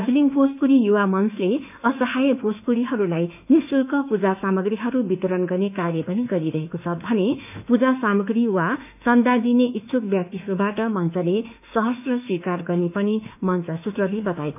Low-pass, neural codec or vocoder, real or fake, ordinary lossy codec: 3.6 kHz; autoencoder, 48 kHz, 32 numbers a frame, DAC-VAE, trained on Japanese speech; fake; none